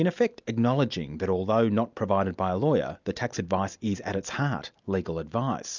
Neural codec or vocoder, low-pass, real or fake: none; 7.2 kHz; real